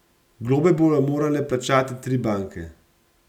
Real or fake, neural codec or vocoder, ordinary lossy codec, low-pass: real; none; none; 19.8 kHz